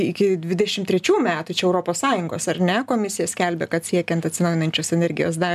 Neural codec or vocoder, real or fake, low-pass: none; real; 14.4 kHz